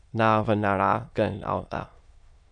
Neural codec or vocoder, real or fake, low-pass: autoencoder, 22.05 kHz, a latent of 192 numbers a frame, VITS, trained on many speakers; fake; 9.9 kHz